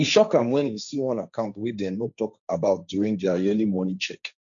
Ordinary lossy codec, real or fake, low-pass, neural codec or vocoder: none; fake; 7.2 kHz; codec, 16 kHz, 1.1 kbps, Voila-Tokenizer